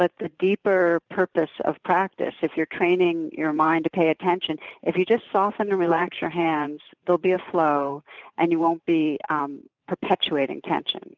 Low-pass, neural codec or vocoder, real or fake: 7.2 kHz; vocoder, 44.1 kHz, 128 mel bands every 512 samples, BigVGAN v2; fake